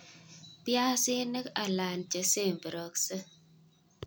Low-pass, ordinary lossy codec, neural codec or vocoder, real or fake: none; none; none; real